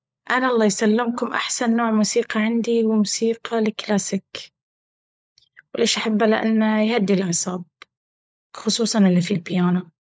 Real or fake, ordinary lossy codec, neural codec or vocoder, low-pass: fake; none; codec, 16 kHz, 16 kbps, FunCodec, trained on LibriTTS, 50 frames a second; none